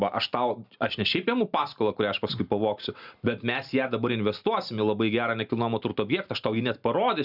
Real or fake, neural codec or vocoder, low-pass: real; none; 5.4 kHz